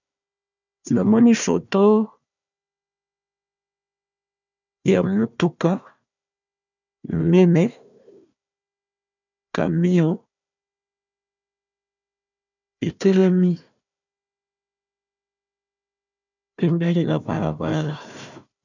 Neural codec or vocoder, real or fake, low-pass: codec, 16 kHz, 1 kbps, FunCodec, trained on Chinese and English, 50 frames a second; fake; 7.2 kHz